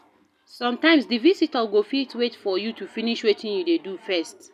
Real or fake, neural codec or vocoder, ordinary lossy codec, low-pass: real; none; none; 14.4 kHz